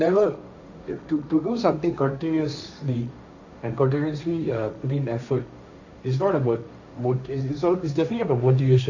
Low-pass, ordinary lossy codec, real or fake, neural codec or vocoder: 7.2 kHz; none; fake; codec, 16 kHz, 1.1 kbps, Voila-Tokenizer